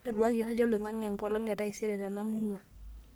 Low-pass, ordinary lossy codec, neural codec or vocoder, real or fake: none; none; codec, 44.1 kHz, 1.7 kbps, Pupu-Codec; fake